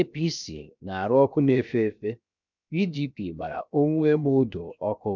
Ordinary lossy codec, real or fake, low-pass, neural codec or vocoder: none; fake; 7.2 kHz; codec, 16 kHz, 0.7 kbps, FocalCodec